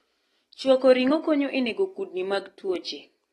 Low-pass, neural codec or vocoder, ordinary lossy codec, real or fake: 19.8 kHz; none; AAC, 32 kbps; real